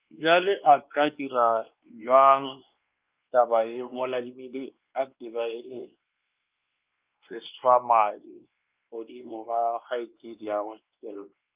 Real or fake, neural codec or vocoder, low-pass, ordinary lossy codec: fake; codec, 16 kHz, 2 kbps, X-Codec, WavLM features, trained on Multilingual LibriSpeech; 3.6 kHz; Opus, 24 kbps